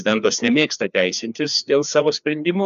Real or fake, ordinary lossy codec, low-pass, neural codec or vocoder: fake; AAC, 96 kbps; 7.2 kHz; codec, 16 kHz, 2 kbps, FreqCodec, larger model